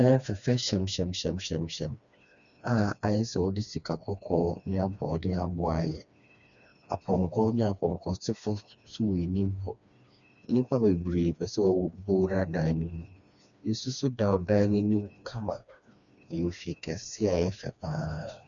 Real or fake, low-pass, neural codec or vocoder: fake; 7.2 kHz; codec, 16 kHz, 2 kbps, FreqCodec, smaller model